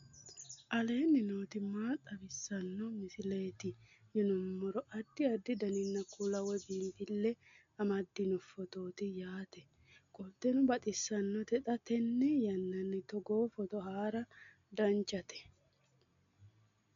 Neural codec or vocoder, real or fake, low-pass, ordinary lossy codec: none; real; 7.2 kHz; MP3, 64 kbps